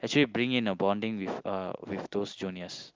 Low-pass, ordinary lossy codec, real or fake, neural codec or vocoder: 7.2 kHz; Opus, 24 kbps; real; none